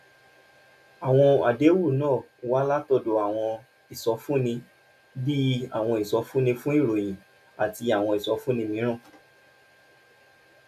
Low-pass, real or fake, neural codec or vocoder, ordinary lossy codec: 14.4 kHz; real; none; none